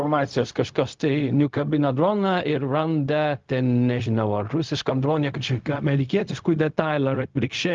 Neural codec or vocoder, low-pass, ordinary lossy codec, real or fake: codec, 16 kHz, 0.4 kbps, LongCat-Audio-Codec; 7.2 kHz; Opus, 32 kbps; fake